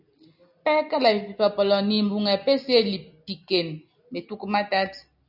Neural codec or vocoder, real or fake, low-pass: none; real; 5.4 kHz